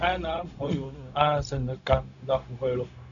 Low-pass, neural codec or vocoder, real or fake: 7.2 kHz; codec, 16 kHz, 0.4 kbps, LongCat-Audio-Codec; fake